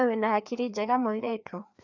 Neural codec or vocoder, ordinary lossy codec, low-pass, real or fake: codec, 16 kHz, 2 kbps, FreqCodec, larger model; none; 7.2 kHz; fake